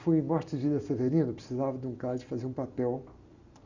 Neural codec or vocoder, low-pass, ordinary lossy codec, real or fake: none; 7.2 kHz; Opus, 64 kbps; real